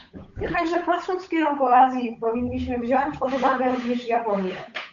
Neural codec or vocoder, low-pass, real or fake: codec, 16 kHz, 8 kbps, FunCodec, trained on Chinese and English, 25 frames a second; 7.2 kHz; fake